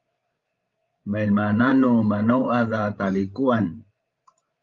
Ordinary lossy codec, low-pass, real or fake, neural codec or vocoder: Opus, 32 kbps; 7.2 kHz; fake; codec, 16 kHz, 16 kbps, FreqCodec, larger model